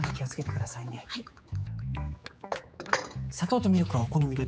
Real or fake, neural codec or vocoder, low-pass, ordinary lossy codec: fake; codec, 16 kHz, 4 kbps, X-Codec, HuBERT features, trained on general audio; none; none